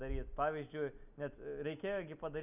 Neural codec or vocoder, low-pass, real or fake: none; 3.6 kHz; real